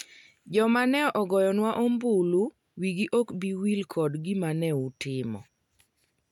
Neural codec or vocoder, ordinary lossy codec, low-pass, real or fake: none; none; 19.8 kHz; real